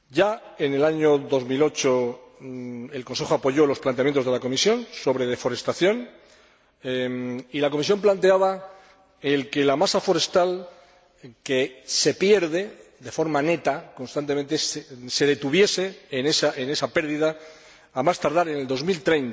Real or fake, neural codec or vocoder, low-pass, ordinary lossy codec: real; none; none; none